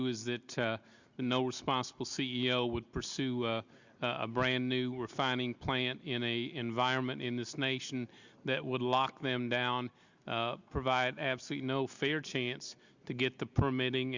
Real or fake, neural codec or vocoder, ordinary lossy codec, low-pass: real; none; Opus, 64 kbps; 7.2 kHz